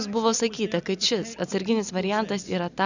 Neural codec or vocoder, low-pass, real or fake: none; 7.2 kHz; real